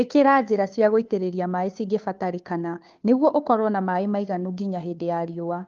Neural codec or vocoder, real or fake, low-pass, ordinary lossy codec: codec, 16 kHz, 2 kbps, FunCodec, trained on Chinese and English, 25 frames a second; fake; 7.2 kHz; Opus, 32 kbps